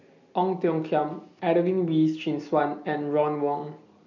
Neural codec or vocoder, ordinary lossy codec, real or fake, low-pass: none; none; real; 7.2 kHz